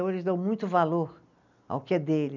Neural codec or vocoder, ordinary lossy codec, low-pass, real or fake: none; none; 7.2 kHz; real